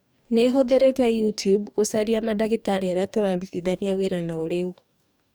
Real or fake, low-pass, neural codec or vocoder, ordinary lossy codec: fake; none; codec, 44.1 kHz, 2.6 kbps, DAC; none